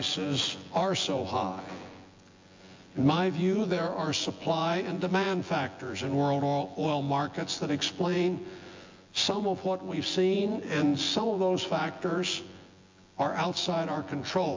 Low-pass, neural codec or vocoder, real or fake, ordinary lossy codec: 7.2 kHz; vocoder, 24 kHz, 100 mel bands, Vocos; fake; MP3, 48 kbps